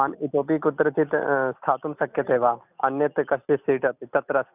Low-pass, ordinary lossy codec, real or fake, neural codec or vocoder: 3.6 kHz; none; real; none